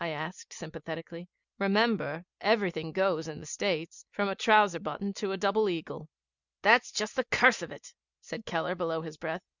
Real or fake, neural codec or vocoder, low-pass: real; none; 7.2 kHz